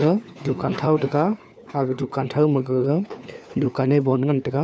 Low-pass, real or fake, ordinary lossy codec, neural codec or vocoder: none; fake; none; codec, 16 kHz, 4 kbps, FunCodec, trained on LibriTTS, 50 frames a second